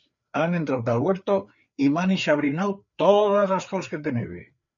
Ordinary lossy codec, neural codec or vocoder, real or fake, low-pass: MP3, 96 kbps; codec, 16 kHz, 4 kbps, FreqCodec, larger model; fake; 7.2 kHz